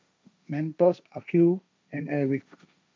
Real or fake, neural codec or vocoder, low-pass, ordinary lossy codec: fake; codec, 16 kHz, 1.1 kbps, Voila-Tokenizer; none; none